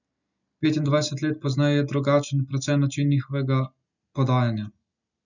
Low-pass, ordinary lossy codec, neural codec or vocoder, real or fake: 7.2 kHz; none; none; real